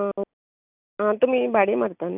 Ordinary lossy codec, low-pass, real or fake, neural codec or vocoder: none; 3.6 kHz; real; none